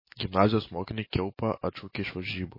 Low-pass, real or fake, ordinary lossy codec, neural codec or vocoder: 5.4 kHz; real; MP3, 24 kbps; none